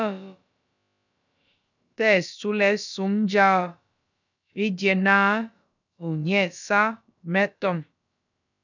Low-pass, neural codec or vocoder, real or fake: 7.2 kHz; codec, 16 kHz, about 1 kbps, DyCAST, with the encoder's durations; fake